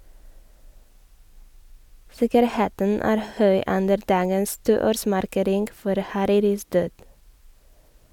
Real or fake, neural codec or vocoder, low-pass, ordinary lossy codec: real; none; 19.8 kHz; none